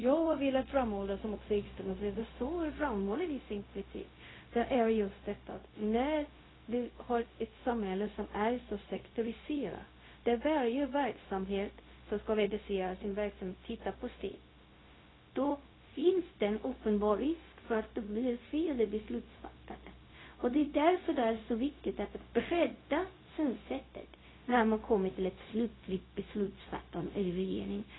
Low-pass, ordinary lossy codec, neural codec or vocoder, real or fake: 7.2 kHz; AAC, 16 kbps; codec, 16 kHz, 0.4 kbps, LongCat-Audio-Codec; fake